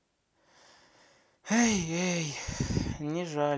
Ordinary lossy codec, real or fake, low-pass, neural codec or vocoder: none; real; none; none